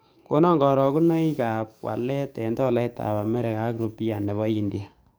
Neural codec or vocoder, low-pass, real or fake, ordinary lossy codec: codec, 44.1 kHz, 7.8 kbps, DAC; none; fake; none